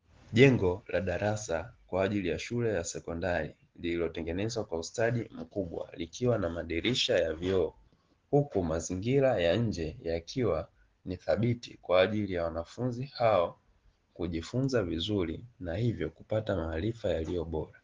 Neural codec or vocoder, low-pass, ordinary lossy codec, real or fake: none; 7.2 kHz; Opus, 16 kbps; real